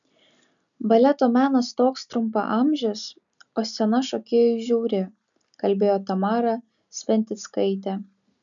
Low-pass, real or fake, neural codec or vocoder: 7.2 kHz; real; none